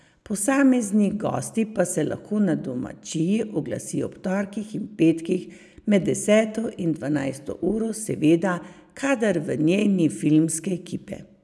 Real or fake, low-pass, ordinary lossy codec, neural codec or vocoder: real; none; none; none